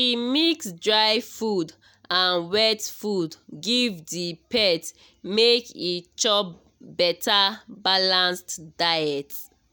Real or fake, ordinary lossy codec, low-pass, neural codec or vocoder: real; none; none; none